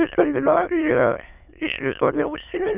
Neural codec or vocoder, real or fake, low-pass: autoencoder, 22.05 kHz, a latent of 192 numbers a frame, VITS, trained on many speakers; fake; 3.6 kHz